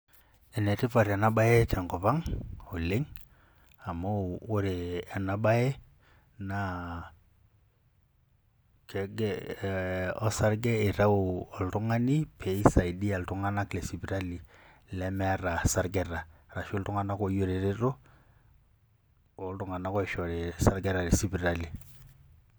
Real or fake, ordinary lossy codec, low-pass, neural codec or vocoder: real; none; none; none